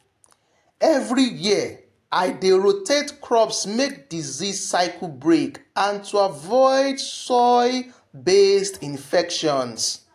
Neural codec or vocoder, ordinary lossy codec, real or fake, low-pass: none; AAC, 64 kbps; real; 14.4 kHz